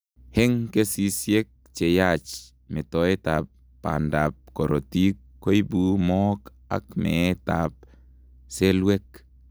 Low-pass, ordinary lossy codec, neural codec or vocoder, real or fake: none; none; none; real